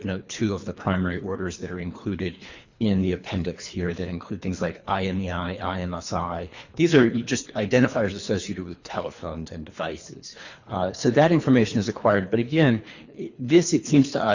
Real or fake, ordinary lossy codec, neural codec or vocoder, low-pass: fake; Opus, 64 kbps; codec, 24 kHz, 3 kbps, HILCodec; 7.2 kHz